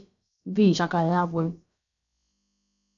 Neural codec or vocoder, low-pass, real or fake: codec, 16 kHz, about 1 kbps, DyCAST, with the encoder's durations; 7.2 kHz; fake